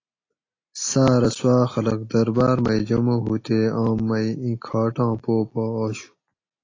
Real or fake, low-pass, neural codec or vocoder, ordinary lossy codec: real; 7.2 kHz; none; AAC, 32 kbps